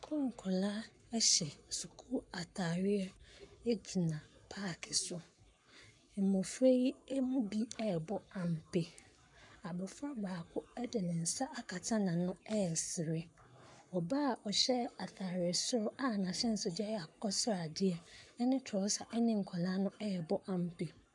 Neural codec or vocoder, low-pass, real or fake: codec, 44.1 kHz, 7.8 kbps, Pupu-Codec; 10.8 kHz; fake